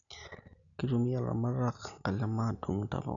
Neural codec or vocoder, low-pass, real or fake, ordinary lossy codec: none; 7.2 kHz; real; none